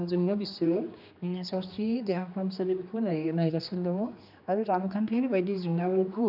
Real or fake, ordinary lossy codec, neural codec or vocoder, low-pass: fake; none; codec, 16 kHz, 2 kbps, X-Codec, HuBERT features, trained on general audio; 5.4 kHz